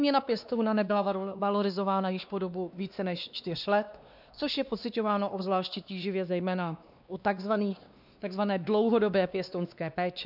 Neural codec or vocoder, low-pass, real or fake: codec, 16 kHz, 2 kbps, X-Codec, WavLM features, trained on Multilingual LibriSpeech; 5.4 kHz; fake